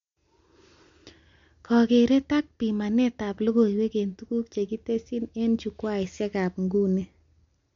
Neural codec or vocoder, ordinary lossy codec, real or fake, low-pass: none; MP3, 48 kbps; real; 7.2 kHz